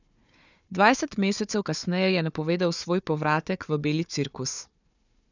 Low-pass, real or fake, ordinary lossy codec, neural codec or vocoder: 7.2 kHz; fake; none; codec, 16 kHz, 4 kbps, FunCodec, trained on Chinese and English, 50 frames a second